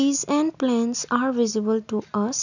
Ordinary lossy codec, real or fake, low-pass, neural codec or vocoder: none; real; 7.2 kHz; none